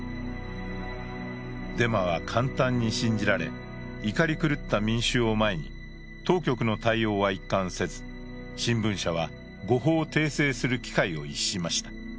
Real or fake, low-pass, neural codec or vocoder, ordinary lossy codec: real; none; none; none